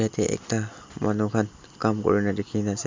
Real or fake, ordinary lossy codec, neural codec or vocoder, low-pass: real; MP3, 64 kbps; none; 7.2 kHz